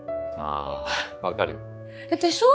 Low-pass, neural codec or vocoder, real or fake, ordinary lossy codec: none; codec, 16 kHz, 4 kbps, X-Codec, HuBERT features, trained on balanced general audio; fake; none